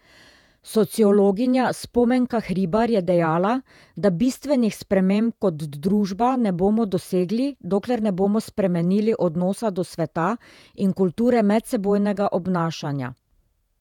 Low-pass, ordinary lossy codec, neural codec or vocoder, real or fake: 19.8 kHz; none; vocoder, 48 kHz, 128 mel bands, Vocos; fake